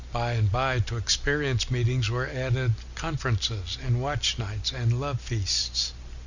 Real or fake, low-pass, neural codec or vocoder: real; 7.2 kHz; none